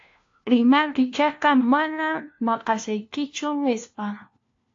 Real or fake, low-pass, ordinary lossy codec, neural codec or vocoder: fake; 7.2 kHz; AAC, 48 kbps; codec, 16 kHz, 1 kbps, FunCodec, trained on LibriTTS, 50 frames a second